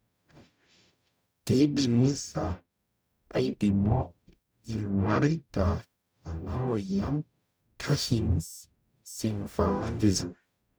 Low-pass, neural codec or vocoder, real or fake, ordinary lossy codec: none; codec, 44.1 kHz, 0.9 kbps, DAC; fake; none